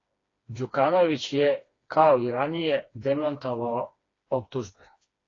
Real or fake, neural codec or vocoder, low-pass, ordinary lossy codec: fake; codec, 16 kHz, 2 kbps, FreqCodec, smaller model; 7.2 kHz; AAC, 32 kbps